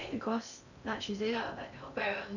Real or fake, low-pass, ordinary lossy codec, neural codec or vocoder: fake; 7.2 kHz; none; codec, 16 kHz in and 24 kHz out, 0.6 kbps, FocalCodec, streaming, 4096 codes